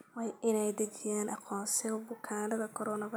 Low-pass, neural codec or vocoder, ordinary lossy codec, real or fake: none; none; none; real